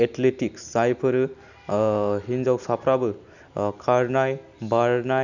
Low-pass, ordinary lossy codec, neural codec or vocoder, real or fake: 7.2 kHz; none; none; real